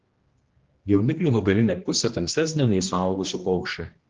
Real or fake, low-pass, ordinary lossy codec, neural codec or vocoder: fake; 7.2 kHz; Opus, 16 kbps; codec, 16 kHz, 1 kbps, X-Codec, HuBERT features, trained on general audio